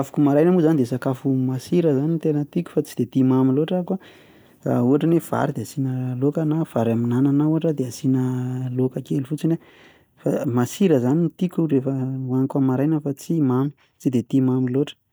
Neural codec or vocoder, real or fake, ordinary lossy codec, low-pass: none; real; none; none